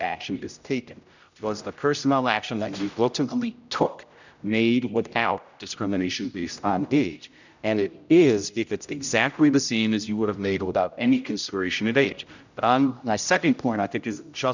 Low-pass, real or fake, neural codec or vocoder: 7.2 kHz; fake; codec, 16 kHz, 0.5 kbps, X-Codec, HuBERT features, trained on general audio